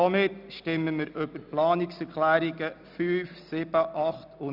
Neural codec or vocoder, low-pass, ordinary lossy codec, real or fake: none; 5.4 kHz; none; real